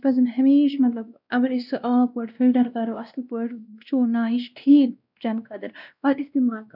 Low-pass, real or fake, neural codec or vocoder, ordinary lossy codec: 5.4 kHz; fake; codec, 16 kHz, 1 kbps, X-Codec, WavLM features, trained on Multilingual LibriSpeech; none